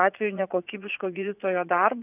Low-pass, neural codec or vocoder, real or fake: 3.6 kHz; vocoder, 44.1 kHz, 80 mel bands, Vocos; fake